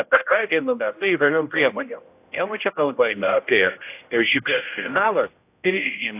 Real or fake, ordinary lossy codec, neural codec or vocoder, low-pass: fake; AAC, 24 kbps; codec, 16 kHz, 0.5 kbps, X-Codec, HuBERT features, trained on general audio; 3.6 kHz